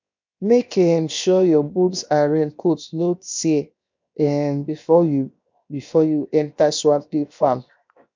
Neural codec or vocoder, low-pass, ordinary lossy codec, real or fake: codec, 16 kHz, 0.7 kbps, FocalCodec; 7.2 kHz; MP3, 64 kbps; fake